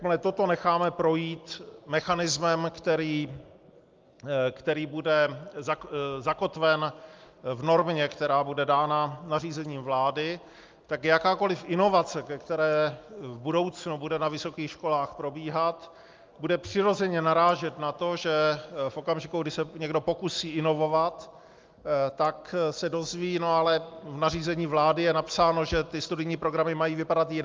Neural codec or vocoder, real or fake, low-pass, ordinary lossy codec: none; real; 7.2 kHz; Opus, 24 kbps